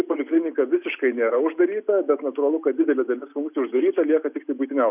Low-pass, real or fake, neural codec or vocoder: 3.6 kHz; real; none